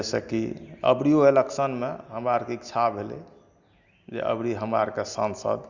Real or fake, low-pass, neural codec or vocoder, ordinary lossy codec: real; 7.2 kHz; none; Opus, 64 kbps